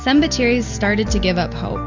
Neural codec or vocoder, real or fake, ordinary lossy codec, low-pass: none; real; Opus, 64 kbps; 7.2 kHz